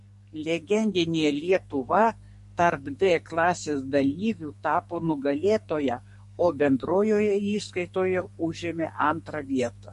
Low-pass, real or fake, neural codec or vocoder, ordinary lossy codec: 14.4 kHz; fake; codec, 44.1 kHz, 2.6 kbps, SNAC; MP3, 48 kbps